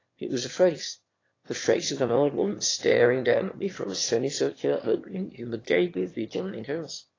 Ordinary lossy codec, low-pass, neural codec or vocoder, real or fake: AAC, 32 kbps; 7.2 kHz; autoencoder, 22.05 kHz, a latent of 192 numbers a frame, VITS, trained on one speaker; fake